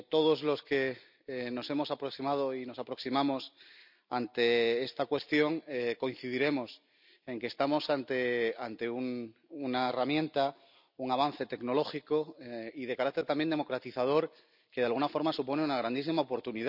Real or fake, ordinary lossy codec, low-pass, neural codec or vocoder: real; none; 5.4 kHz; none